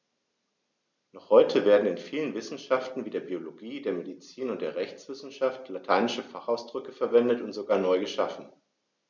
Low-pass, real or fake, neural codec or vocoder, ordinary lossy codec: 7.2 kHz; real; none; none